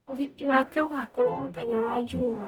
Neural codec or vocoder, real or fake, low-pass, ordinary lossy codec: codec, 44.1 kHz, 0.9 kbps, DAC; fake; 19.8 kHz; none